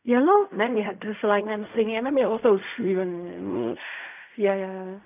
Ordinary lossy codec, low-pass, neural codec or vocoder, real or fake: none; 3.6 kHz; codec, 16 kHz in and 24 kHz out, 0.4 kbps, LongCat-Audio-Codec, fine tuned four codebook decoder; fake